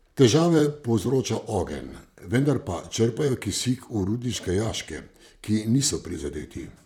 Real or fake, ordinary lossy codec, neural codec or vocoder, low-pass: fake; none; vocoder, 44.1 kHz, 128 mel bands, Pupu-Vocoder; 19.8 kHz